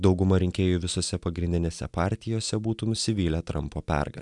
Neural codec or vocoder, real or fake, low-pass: none; real; 10.8 kHz